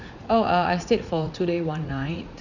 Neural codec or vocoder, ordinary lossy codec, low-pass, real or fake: vocoder, 44.1 kHz, 80 mel bands, Vocos; none; 7.2 kHz; fake